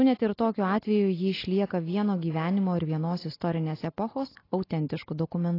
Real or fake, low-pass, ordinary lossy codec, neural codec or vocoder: real; 5.4 kHz; AAC, 24 kbps; none